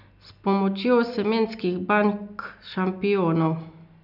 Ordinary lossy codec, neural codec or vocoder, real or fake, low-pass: none; none; real; 5.4 kHz